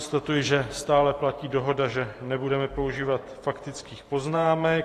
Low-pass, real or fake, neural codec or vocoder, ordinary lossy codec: 14.4 kHz; fake; vocoder, 44.1 kHz, 128 mel bands every 512 samples, BigVGAN v2; AAC, 48 kbps